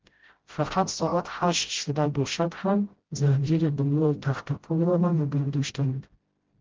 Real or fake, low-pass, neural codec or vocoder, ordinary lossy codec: fake; 7.2 kHz; codec, 16 kHz, 0.5 kbps, FreqCodec, smaller model; Opus, 16 kbps